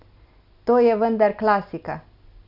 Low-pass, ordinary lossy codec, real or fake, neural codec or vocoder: 5.4 kHz; none; real; none